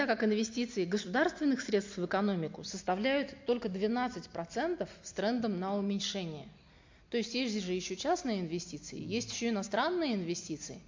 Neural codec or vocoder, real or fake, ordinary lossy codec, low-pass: none; real; MP3, 48 kbps; 7.2 kHz